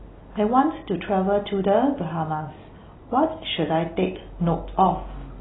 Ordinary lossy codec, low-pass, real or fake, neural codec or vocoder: AAC, 16 kbps; 7.2 kHz; real; none